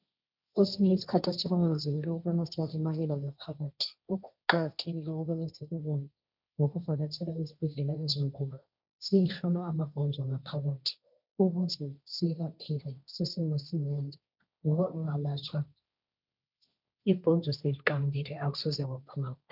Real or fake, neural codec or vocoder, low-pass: fake; codec, 16 kHz, 1.1 kbps, Voila-Tokenizer; 5.4 kHz